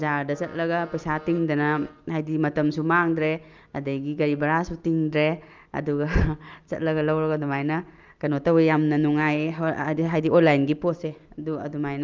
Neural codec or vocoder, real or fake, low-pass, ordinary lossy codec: none; real; 7.2 kHz; Opus, 24 kbps